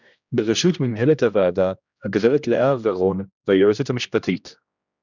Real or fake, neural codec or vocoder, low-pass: fake; codec, 16 kHz, 1 kbps, X-Codec, HuBERT features, trained on general audio; 7.2 kHz